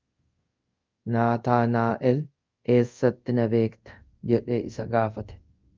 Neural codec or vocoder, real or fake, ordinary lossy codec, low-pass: codec, 24 kHz, 0.5 kbps, DualCodec; fake; Opus, 24 kbps; 7.2 kHz